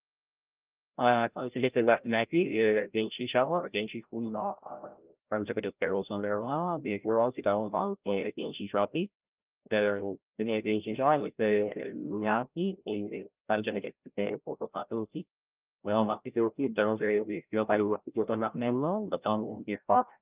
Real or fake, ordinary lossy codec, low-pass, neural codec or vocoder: fake; Opus, 24 kbps; 3.6 kHz; codec, 16 kHz, 0.5 kbps, FreqCodec, larger model